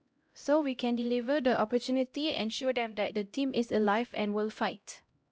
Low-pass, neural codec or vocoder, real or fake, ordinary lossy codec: none; codec, 16 kHz, 0.5 kbps, X-Codec, HuBERT features, trained on LibriSpeech; fake; none